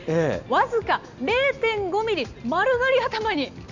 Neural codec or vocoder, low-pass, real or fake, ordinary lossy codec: none; 7.2 kHz; real; none